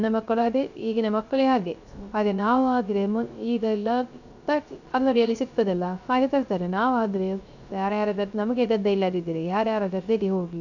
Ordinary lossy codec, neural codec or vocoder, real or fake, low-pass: none; codec, 16 kHz, 0.3 kbps, FocalCodec; fake; 7.2 kHz